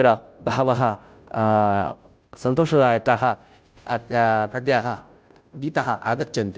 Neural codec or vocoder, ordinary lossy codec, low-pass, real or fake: codec, 16 kHz, 0.5 kbps, FunCodec, trained on Chinese and English, 25 frames a second; none; none; fake